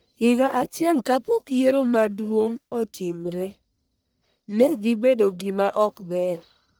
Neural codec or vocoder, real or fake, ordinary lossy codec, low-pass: codec, 44.1 kHz, 1.7 kbps, Pupu-Codec; fake; none; none